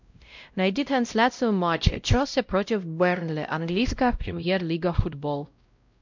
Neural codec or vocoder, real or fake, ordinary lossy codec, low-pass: codec, 16 kHz, 0.5 kbps, X-Codec, WavLM features, trained on Multilingual LibriSpeech; fake; MP3, 64 kbps; 7.2 kHz